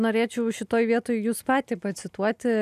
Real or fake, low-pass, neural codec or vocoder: real; 14.4 kHz; none